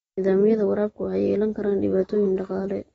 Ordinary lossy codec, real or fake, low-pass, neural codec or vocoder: AAC, 24 kbps; real; 14.4 kHz; none